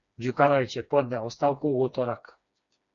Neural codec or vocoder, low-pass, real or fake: codec, 16 kHz, 2 kbps, FreqCodec, smaller model; 7.2 kHz; fake